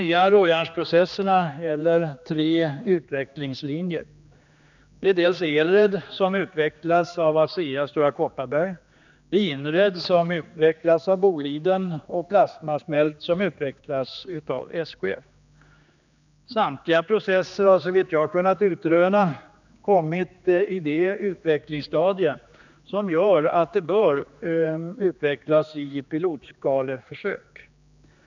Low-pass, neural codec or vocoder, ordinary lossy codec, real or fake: 7.2 kHz; codec, 16 kHz, 2 kbps, X-Codec, HuBERT features, trained on general audio; none; fake